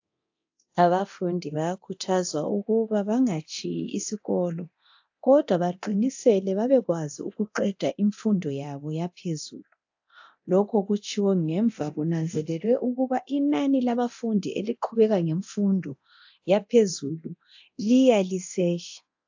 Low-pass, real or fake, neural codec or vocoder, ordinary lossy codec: 7.2 kHz; fake; codec, 24 kHz, 0.9 kbps, DualCodec; AAC, 48 kbps